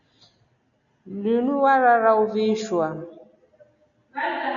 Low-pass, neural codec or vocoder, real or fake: 7.2 kHz; none; real